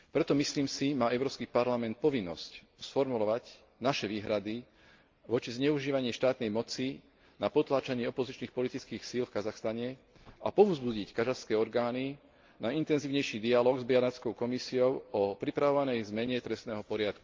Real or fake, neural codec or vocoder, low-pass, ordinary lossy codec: real; none; 7.2 kHz; Opus, 32 kbps